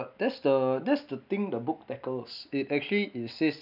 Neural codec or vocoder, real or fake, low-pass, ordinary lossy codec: none; real; 5.4 kHz; none